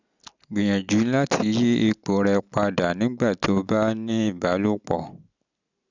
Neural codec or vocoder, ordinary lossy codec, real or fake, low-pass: none; none; real; 7.2 kHz